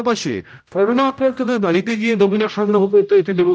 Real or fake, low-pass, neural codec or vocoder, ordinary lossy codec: fake; none; codec, 16 kHz, 0.5 kbps, X-Codec, HuBERT features, trained on general audio; none